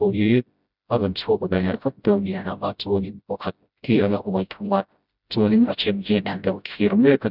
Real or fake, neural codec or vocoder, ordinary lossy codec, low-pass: fake; codec, 16 kHz, 0.5 kbps, FreqCodec, smaller model; none; 5.4 kHz